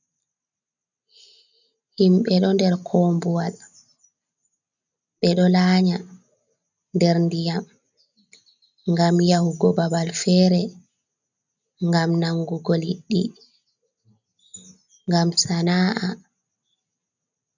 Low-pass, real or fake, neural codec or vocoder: 7.2 kHz; real; none